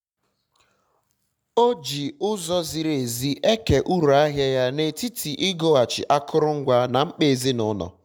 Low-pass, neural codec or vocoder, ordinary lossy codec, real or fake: 19.8 kHz; none; none; real